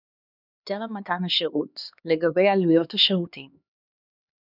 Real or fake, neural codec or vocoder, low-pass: fake; codec, 16 kHz, 2 kbps, X-Codec, HuBERT features, trained on LibriSpeech; 5.4 kHz